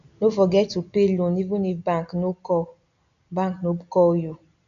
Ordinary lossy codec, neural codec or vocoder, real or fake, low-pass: none; none; real; 7.2 kHz